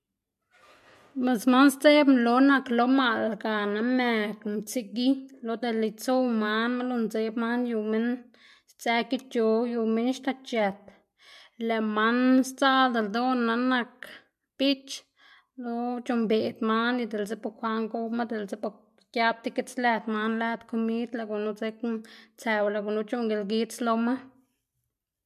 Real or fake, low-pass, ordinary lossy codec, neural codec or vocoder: real; 14.4 kHz; MP3, 64 kbps; none